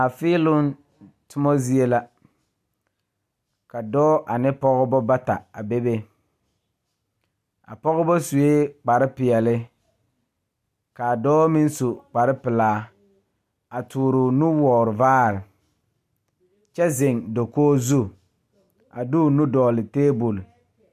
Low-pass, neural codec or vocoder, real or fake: 14.4 kHz; none; real